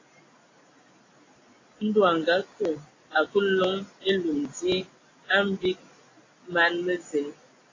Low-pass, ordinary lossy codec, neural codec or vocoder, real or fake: 7.2 kHz; AAC, 32 kbps; none; real